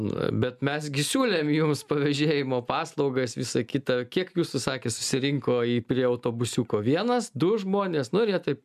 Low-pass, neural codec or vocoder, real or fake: 14.4 kHz; none; real